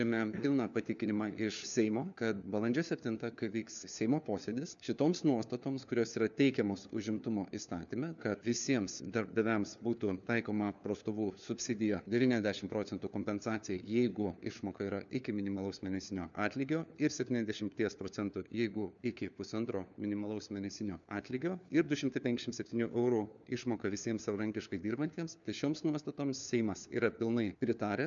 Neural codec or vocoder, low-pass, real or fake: codec, 16 kHz, 4 kbps, FunCodec, trained on LibriTTS, 50 frames a second; 7.2 kHz; fake